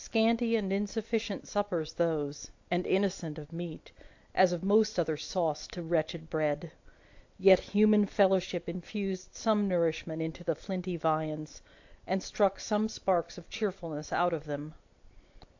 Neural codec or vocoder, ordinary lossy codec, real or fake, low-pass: none; AAC, 48 kbps; real; 7.2 kHz